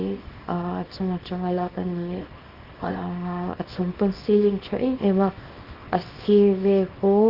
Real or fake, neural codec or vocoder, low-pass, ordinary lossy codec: fake; codec, 24 kHz, 0.9 kbps, WavTokenizer, small release; 5.4 kHz; Opus, 32 kbps